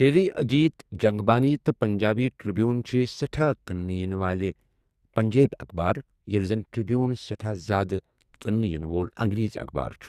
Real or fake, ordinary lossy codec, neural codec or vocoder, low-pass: fake; Opus, 64 kbps; codec, 44.1 kHz, 2.6 kbps, SNAC; 14.4 kHz